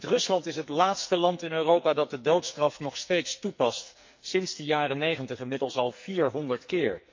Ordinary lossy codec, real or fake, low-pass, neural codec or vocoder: MP3, 48 kbps; fake; 7.2 kHz; codec, 44.1 kHz, 2.6 kbps, SNAC